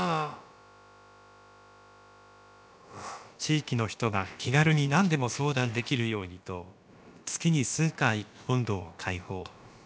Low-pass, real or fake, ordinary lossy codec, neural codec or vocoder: none; fake; none; codec, 16 kHz, about 1 kbps, DyCAST, with the encoder's durations